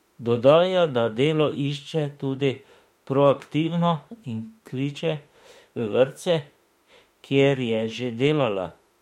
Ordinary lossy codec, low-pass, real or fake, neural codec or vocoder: MP3, 64 kbps; 19.8 kHz; fake; autoencoder, 48 kHz, 32 numbers a frame, DAC-VAE, trained on Japanese speech